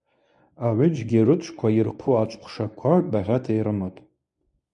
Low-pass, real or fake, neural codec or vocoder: 10.8 kHz; fake; codec, 24 kHz, 0.9 kbps, WavTokenizer, medium speech release version 1